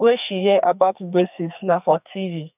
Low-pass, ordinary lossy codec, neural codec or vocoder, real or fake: 3.6 kHz; none; codec, 44.1 kHz, 2.6 kbps, SNAC; fake